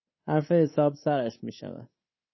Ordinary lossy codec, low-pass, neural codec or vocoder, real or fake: MP3, 24 kbps; 7.2 kHz; codec, 24 kHz, 3.1 kbps, DualCodec; fake